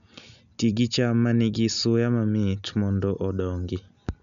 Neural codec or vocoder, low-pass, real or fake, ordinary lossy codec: none; 7.2 kHz; real; none